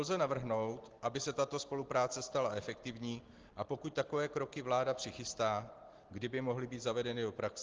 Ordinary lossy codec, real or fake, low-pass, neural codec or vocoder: Opus, 16 kbps; real; 7.2 kHz; none